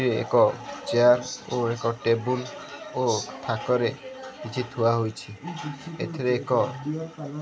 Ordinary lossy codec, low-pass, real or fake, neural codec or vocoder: none; none; real; none